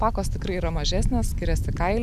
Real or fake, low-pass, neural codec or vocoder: real; 14.4 kHz; none